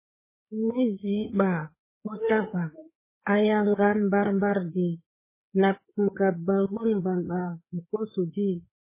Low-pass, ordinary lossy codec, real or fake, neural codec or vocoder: 3.6 kHz; MP3, 16 kbps; fake; codec, 16 kHz, 4 kbps, FreqCodec, larger model